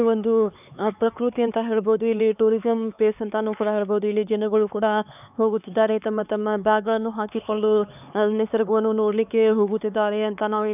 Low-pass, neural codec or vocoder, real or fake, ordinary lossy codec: 3.6 kHz; codec, 16 kHz, 4 kbps, X-Codec, HuBERT features, trained on LibriSpeech; fake; none